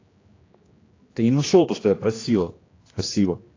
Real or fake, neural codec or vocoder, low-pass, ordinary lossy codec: fake; codec, 16 kHz, 1 kbps, X-Codec, HuBERT features, trained on general audio; 7.2 kHz; AAC, 32 kbps